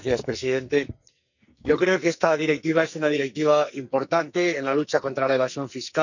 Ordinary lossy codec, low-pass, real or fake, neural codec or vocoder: none; 7.2 kHz; fake; codec, 44.1 kHz, 2.6 kbps, SNAC